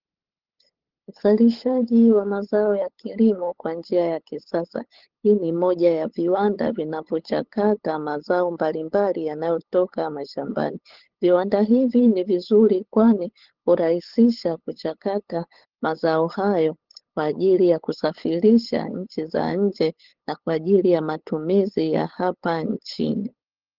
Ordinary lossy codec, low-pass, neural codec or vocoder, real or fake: Opus, 16 kbps; 5.4 kHz; codec, 16 kHz, 8 kbps, FunCodec, trained on LibriTTS, 25 frames a second; fake